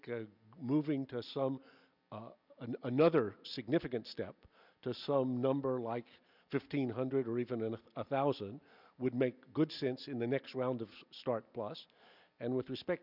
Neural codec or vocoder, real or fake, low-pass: none; real; 5.4 kHz